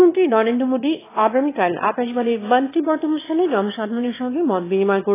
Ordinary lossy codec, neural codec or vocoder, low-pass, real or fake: AAC, 16 kbps; autoencoder, 22.05 kHz, a latent of 192 numbers a frame, VITS, trained on one speaker; 3.6 kHz; fake